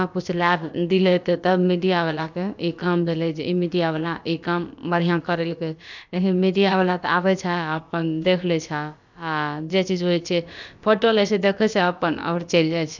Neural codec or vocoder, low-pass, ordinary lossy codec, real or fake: codec, 16 kHz, about 1 kbps, DyCAST, with the encoder's durations; 7.2 kHz; none; fake